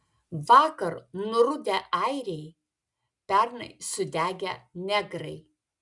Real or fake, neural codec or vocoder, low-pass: real; none; 10.8 kHz